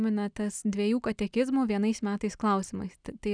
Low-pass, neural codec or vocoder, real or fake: 9.9 kHz; none; real